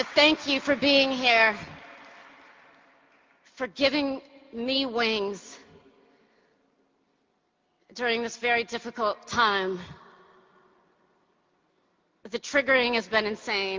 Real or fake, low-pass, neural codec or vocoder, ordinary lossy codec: real; 7.2 kHz; none; Opus, 24 kbps